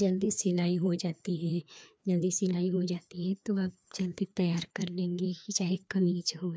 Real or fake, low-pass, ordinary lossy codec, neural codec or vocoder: fake; none; none; codec, 16 kHz, 2 kbps, FreqCodec, larger model